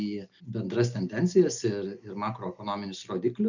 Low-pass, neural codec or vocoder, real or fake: 7.2 kHz; none; real